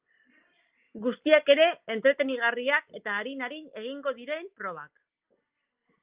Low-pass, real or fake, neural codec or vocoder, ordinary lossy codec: 3.6 kHz; real; none; Opus, 24 kbps